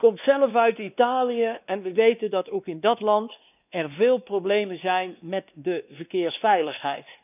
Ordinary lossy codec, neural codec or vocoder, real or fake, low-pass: none; codec, 16 kHz, 2 kbps, X-Codec, WavLM features, trained on Multilingual LibriSpeech; fake; 3.6 kHz